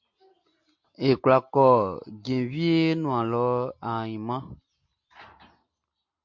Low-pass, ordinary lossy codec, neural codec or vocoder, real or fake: 7.2 kHz; MP3, 48 kbps; none; real